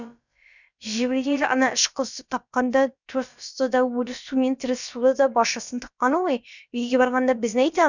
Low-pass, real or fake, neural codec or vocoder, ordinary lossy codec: 7.2 kHz; fake; codec, 16 kHz, about 1 kbps, DyCAST, with the encoder's durations; none